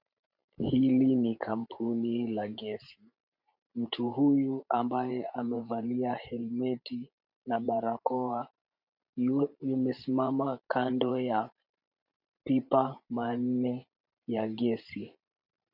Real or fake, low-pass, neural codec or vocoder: fake; 5.4 kHz; vocoder, 44.1 kHz, 128 mel bands every 512 samples, BigVGAN v2